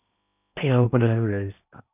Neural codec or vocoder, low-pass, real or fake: codec, 16 kHz in and 24 kHz out, 0.8 kbps, FocalCodec, streaming, 65536 codes; 3.6 kHz; fake